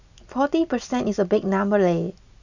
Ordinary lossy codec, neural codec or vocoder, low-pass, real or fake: none; none; 7.2 kHz; real